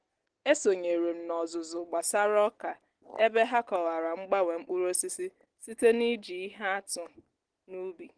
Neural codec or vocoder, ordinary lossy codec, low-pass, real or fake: none; Opus, 16 kbps; 9.9 kHz; real